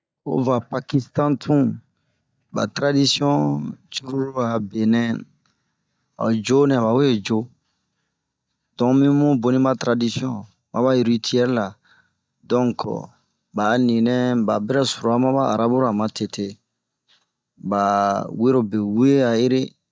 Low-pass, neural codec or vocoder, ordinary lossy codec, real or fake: none; none; none; real